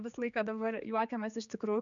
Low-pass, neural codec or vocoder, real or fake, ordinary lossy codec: 7.2 kHz; codec, 16 kHz, 4 kbps, X-Codec, HuBERT features, trained on general audio; fake; MP3, 96 kbps